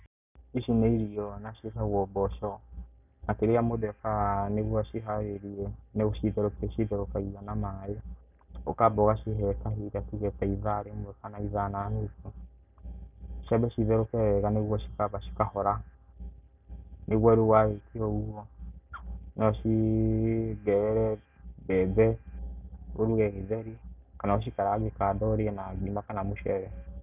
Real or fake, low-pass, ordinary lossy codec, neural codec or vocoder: real; 3.6 kHz; none; none